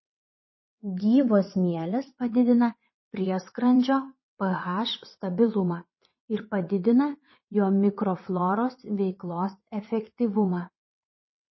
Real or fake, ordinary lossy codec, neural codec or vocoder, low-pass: fake; MP3, 24 kbps; vocoder, 22.05 kHz, 80 mel bands, WaveNeXt; 7.2 kHz